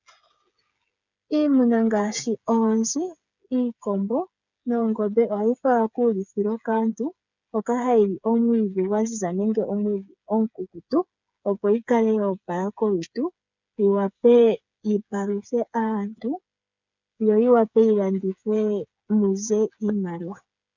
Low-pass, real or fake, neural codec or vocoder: 7.2 kHz; fake; codec, 16 kHz, 8 kbps, FreqCodec, smaller model